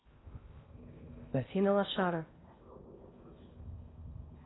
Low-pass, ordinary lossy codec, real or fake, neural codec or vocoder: 7.2 kHz; AAC, 16 kbps; fake; codec, 16 kHz in and 24 kHz out, 0.8 kbps, FocalCodec, streaming, 65536 codes